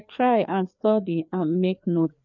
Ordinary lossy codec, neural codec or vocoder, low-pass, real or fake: none; codec, 16 kHz, 2 kbps, FreqCodec, larger model; 7.2 kHz; fake